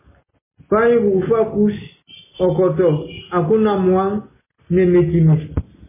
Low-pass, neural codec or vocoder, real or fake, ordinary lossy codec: 3.6 kHz; none; real; MP3, 16 kbps